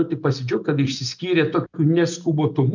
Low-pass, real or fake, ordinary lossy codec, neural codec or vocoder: 7.2 kHz; real; MP3, 64 kbps; none